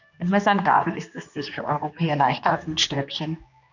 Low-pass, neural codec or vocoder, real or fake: 7.2 kHz; codec, 16 kHz, 2 kbps, X-Codec, HuBERT features, trained on general audio; fake